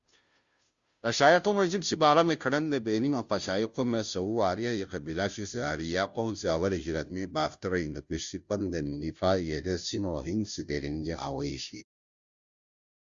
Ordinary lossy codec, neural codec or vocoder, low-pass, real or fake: Opus, 64 kbps; codec, 16 kHz, 0.5 kbps, FunCodec, trained on Chinese and English, 25 frames a second; 7.2 kHz; fake